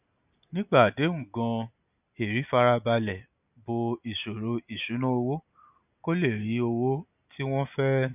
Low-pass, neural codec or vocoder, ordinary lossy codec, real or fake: 3.6 kHz; none; none; real